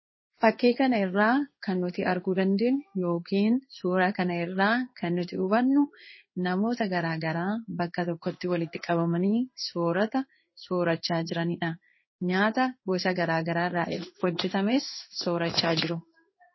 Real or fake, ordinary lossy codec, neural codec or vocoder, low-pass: fake; MP3, 24 kbps; codec, 16 kHz in and 24 kHz out, 1 kbps, XY-Tokenizer; 7.2 kHz